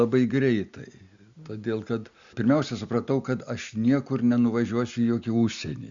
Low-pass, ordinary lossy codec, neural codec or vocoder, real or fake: 7.2 kHz; Opus, 64 kbps; none; real